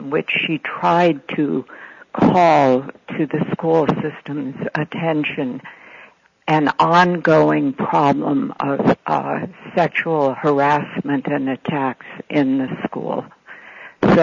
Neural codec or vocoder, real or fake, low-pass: none; real; 7.2 kHz